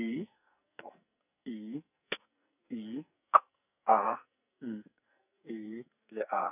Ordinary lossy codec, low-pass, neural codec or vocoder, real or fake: none; 3.6 kHz; codec, 44.1 kHz, 2.6 kbps, SNAC; fake